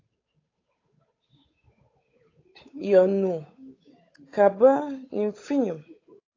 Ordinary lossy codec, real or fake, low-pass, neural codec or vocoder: AAC, 32 kbps; fake; 7.2 kHz; codec, 16 kHz, 8 kbps, FunCodec, trained on Chinese and English, 25 frames a second